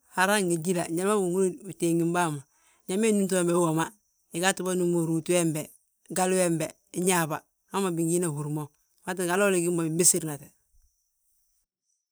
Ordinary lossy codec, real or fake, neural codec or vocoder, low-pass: none; fake; vocoder, 44.1 kHz, 128 mel bands every 512 samples, BigVGAN v2; none